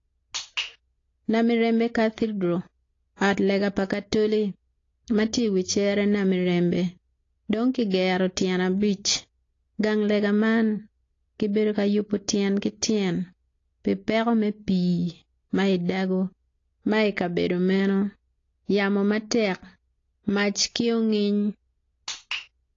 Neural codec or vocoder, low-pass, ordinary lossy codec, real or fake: none; 7.2 kHz; AAC, 32 kbps; real